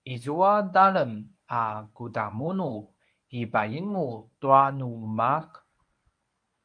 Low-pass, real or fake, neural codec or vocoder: 9.9 kHz; fake; codec, 24 kHz, 0.9 kbps, WavTokenizer, medium speech release version 1